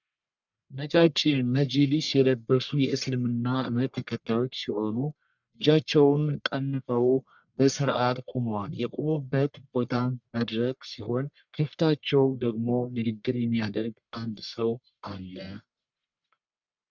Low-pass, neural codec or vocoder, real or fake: 7.2 kHz; codec, 44.1 kHz, 1.7 kbps, Pupu-Codec; fake